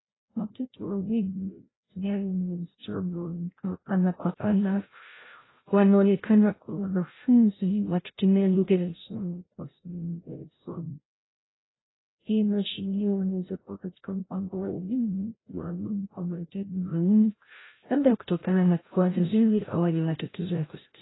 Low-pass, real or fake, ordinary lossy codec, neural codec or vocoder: 7.2 kHz; fake; AAC, 16 kbps; codec, 16 kHz, 0.5 kbps, FreqCodec, larger model